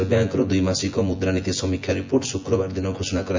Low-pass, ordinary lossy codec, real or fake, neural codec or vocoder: 7.2 kHz; MP3, 64 kbps; fake; vocoder, 24 kHz, 100 mel bands, Vocos